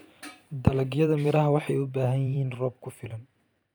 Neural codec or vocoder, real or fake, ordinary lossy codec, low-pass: vocoder, 44.1 kHz, 128 mel bands every 512 samples, BigVGAN v2; fake; none; none